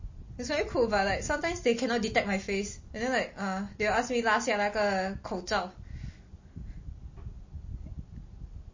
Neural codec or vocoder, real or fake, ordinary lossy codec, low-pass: none; real; MP3, 32 kbps; 7.2 kHz